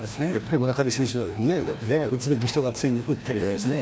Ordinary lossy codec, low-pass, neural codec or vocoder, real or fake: none; none; codec, 16 kHz, 1 kbps, FreqCodec, larger model; fake